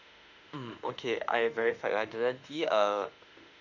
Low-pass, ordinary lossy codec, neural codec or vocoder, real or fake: 7.2 kHz; none; autoencoder, 48 kHz, 32 numbers a frame, DAC-VAE, trained on Japanese speech; fake